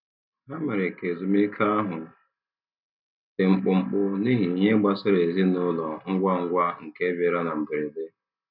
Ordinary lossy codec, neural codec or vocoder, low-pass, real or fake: none; none; 5.4 kHz; real